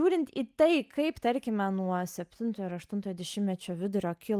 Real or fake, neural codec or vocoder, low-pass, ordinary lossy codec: real; none; 14.4 kHz; Opus, 32 kbps